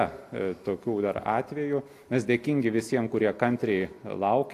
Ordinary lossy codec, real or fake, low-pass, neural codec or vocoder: AAC, 64 kbps; real; 14.4 kHz; none